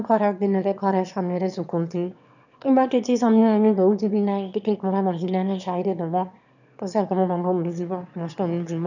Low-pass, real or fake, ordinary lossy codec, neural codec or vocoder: 7.2 kHz; fake; none; autoencoder, 22.05 kHz, a latent of 192 numbers a frame, VITS, trained on one speaker